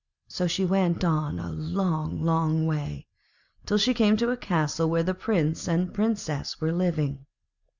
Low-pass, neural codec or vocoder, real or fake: 7.2 kHz; none; real